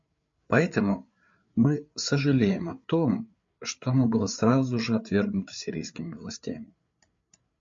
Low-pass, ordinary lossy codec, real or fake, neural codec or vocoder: 7.2 kHz; MP3, 48 kbps; fake; codec, 16 kHz, 8 kbps, FreqCodec, larger model